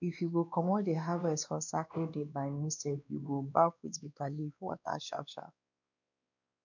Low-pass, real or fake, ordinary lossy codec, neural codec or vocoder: 7.2 kHz; fake; none; codec, 16 kHz, 2 kbps, X-Codec, WavLM features, trained on Multilingual LibriSpeech